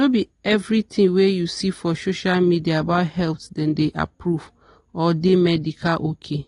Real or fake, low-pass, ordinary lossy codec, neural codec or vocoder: real; 10.8 kHz; AAC, 32 kbps; none